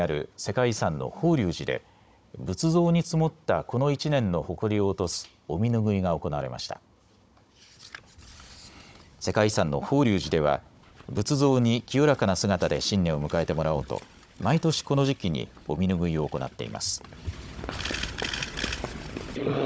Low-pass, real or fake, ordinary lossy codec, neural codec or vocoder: none; fake; none; codec, 16 kHz, 16 kbps, FunCodec, trained on Chinese and English, 50 frames a second